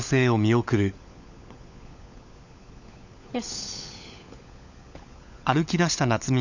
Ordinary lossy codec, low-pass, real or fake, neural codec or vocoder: none; 7.2 kHz; fake; codec, 16 kHz, 16 kbps, FunCodec, trained on LibriTTS, 50 frames a second